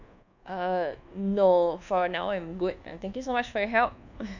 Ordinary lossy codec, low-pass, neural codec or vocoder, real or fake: none; 7.2 kHz; codec, 24 kHz, 1.2 kbps, DualCodec; fake